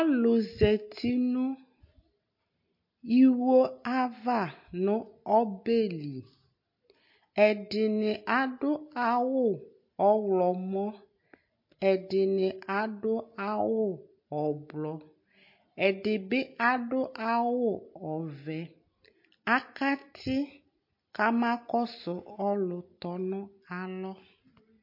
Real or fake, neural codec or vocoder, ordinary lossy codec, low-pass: real; none; MP3, 32 kbps; 5.4 kHz